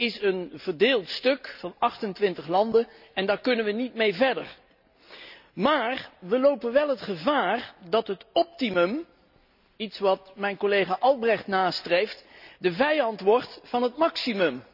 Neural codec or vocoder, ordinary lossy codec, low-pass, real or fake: none; none; 5.4 kHz; real